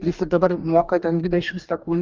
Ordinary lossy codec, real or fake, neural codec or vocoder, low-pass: Opus, 24 kbps; fake; codec, 44.1 kHz, 2.6 kbps, DAC; 7.2 kHz